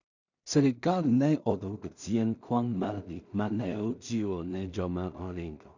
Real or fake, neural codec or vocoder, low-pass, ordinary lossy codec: fake; codec, 16 kHz in and 24 kHz out, 0.4 kbps, LongCat-Audio-Codec, two codebook decoder; 7.2 kHz; none